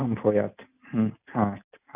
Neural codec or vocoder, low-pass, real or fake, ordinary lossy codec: none; 3.6 kHz; real; none